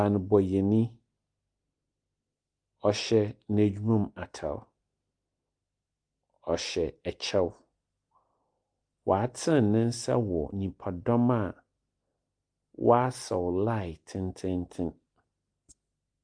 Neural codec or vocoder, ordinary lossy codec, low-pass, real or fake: none; Opus, 32 kbps; 9.9 kHz; real